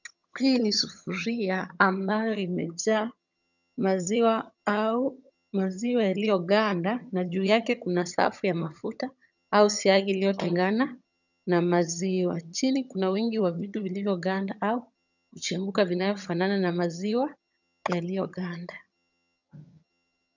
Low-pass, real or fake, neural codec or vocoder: 7.2 kHz; fake; vocoder, 22.05 kHz, 80 mel bands, HiFi-GAN